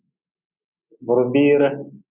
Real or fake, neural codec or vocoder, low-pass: real; none; 3.6 kHz